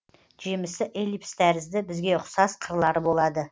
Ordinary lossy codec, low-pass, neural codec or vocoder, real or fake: none; none; none; real